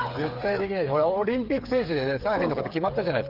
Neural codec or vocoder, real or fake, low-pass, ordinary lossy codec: codec, 16 kHz, 8 kbps, FreqCodec, smaller model; fake; 5.4 kHz; Opus, 32 kbps